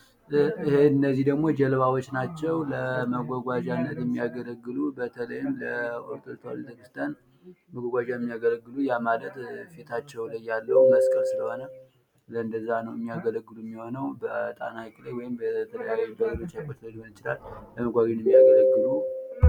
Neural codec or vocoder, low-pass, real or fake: none; 19.8 kHz; real